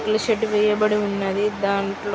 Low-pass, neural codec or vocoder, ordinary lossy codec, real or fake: none; none; none; real